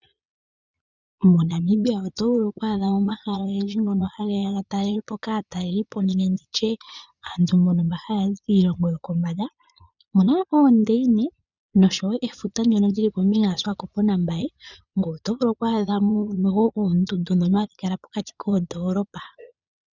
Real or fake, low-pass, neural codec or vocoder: fake; 7.2 kHz; vocoder, 22.05 kHz, 80 mel bands, Vocos